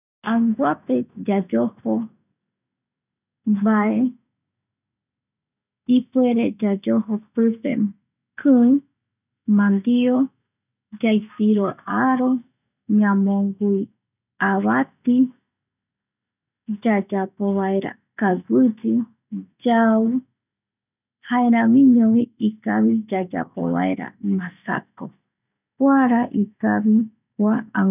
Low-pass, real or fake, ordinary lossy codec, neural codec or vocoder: 3.6 kHz; real; none; none